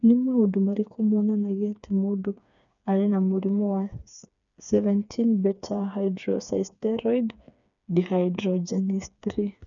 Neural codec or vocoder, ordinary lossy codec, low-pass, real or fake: codec, 16 kHz, 4 kbps, FreqCodec, smaller model; none; 7.2 kHz; fake